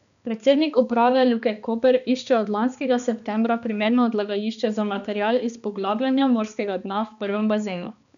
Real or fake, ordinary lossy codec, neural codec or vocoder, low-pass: fake; none; codec, 16 kHz, 2 kbps, X-Codec, HuBERT features, trained on balanced general audio; 7.2 kHz